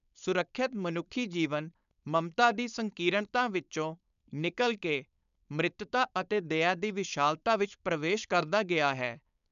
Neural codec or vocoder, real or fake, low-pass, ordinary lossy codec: codec, 16 kHz, 4.8 kbps, FACodec; fake; 7.2 kHz; none